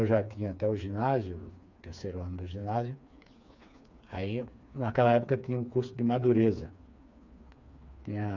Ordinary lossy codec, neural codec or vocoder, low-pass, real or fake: none; codec, 16 kHz, 4 kbps, FreqCodec, smaller model; 7.2 kHz; fake